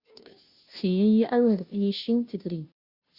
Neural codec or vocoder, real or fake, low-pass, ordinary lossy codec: codec, 16 kHz, 0.5 kbps, FunCodec, trained on Chinese and English, 25 frames a second; fake; 5.4 kHz; Opus, 64 kbps